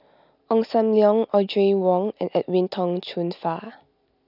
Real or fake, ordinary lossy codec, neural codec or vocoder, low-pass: real; none; none; 5.4 kHz